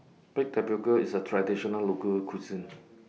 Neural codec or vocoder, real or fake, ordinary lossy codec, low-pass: none; real; none; none